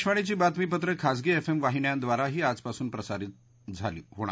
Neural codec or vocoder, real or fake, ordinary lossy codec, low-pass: none; real; none; none